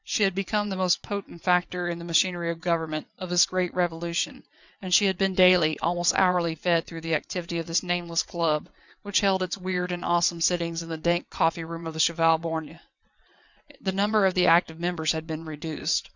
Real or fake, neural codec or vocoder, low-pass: fake; vocoder, 22.05 kHz, 80 mel bands, WaveNeXt; 7.2 kHz